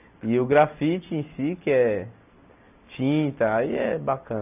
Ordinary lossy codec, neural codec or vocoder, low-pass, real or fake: none; none; 3.6 kHz; real